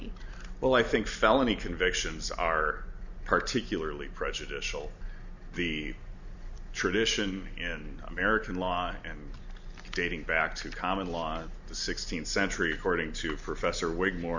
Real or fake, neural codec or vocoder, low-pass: real; none; 7.2 kHz